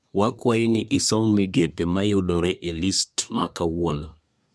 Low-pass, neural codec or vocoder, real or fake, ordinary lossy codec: none; codec, 24 kHz, 1 kbps, SNAC; fake; none